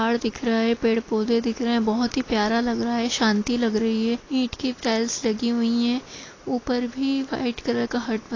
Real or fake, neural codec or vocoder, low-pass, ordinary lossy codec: real; none; 7.2 kHz; AAC, 32 kbps